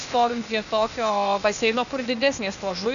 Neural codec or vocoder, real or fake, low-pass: codec, 16 kHz, 0.8 kbps, ZipCodec; fake; 7.2 kHz